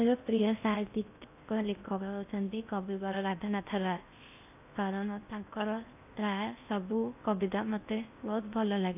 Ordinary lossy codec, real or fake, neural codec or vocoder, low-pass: AAC, 32 kbps; fake; codec, 16 kHz in and 24 kHz out, 0.6 kbps, FocalCodec, streaming, 4096 codes; 3.6 kHz